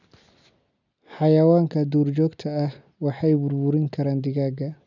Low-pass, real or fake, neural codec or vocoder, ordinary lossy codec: 7.2 kHz; real; none; none